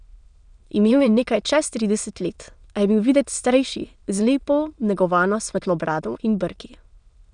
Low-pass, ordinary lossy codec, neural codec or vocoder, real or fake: 9.9 kHz; none; autoencoder, 22.05 kHz, a latent of 192 numbers a frame, VITS, trained on many speakers; fake